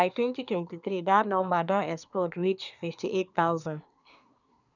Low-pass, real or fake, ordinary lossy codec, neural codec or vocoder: 7.2 kHz; fake; none; codec, 24 kHz, 1 kbps, SNAC